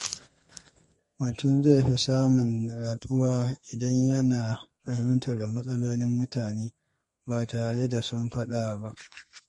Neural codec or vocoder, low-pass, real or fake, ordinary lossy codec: codec, 32 kHz, 1.9 kbps, SNAC; 14.4 kHz; fake; MP3, 48 kbps